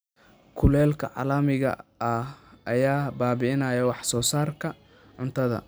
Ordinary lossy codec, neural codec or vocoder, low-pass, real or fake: none; none; none; real